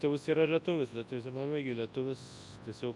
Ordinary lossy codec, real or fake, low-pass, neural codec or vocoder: AAC, 64 kbps; fake; 10.8 kHz; codec, 24 kHz, 0.9 kbps, WavTokenizer, large speech release